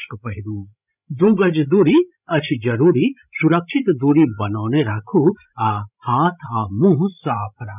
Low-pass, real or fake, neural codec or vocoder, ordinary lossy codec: 3.6 kHz; fake; codec, 16 kHz, 16 kbps, FreqCodec, larger model; none